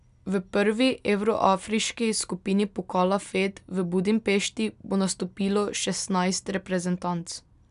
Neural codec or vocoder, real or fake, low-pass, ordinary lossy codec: none; real; 10.8 kHz; none